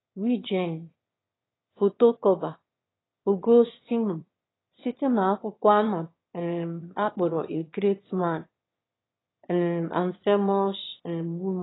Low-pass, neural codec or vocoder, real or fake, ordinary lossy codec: 7.2 kHz; autoencoder, 22.05 kHz, a latent of 192 numbers a frame, VITS, trained on one speaker; fake; AAC, 16 kbps